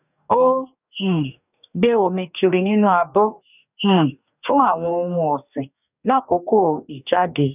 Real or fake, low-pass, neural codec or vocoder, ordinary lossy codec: fake; 3.6 kHz; codec, 44.1 kHz, 2.6 kbps, DAC; none